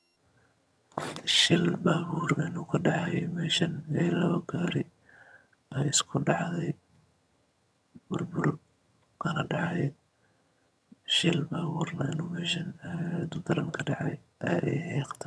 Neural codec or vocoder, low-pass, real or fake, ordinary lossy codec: vocoder, 22.05 kHz, 80 mel bands, HiFi-GAN; none; fake; none